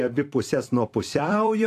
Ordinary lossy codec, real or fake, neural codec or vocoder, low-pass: AAC, 64 kbps; fake; vocoder, 44.1 kHz, 128 mel bands every 256 samples, BigVGAN v2; 14.4 kHz